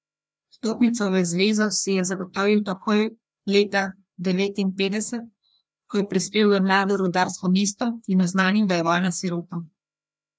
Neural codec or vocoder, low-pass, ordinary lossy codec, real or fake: codec, 16 kHz, 1 kbps, FreqCodec, larger model; none; none; fake